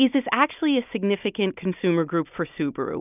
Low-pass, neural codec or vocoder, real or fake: 3.6 kHz; none; real